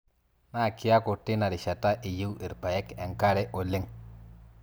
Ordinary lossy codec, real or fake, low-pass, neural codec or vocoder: none; real; none; none